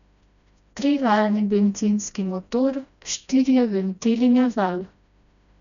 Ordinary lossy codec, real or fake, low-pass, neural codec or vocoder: none; fake; 7.2 kHz; codec, 16 kHz, 1 kbps, FreqCodec, smaller model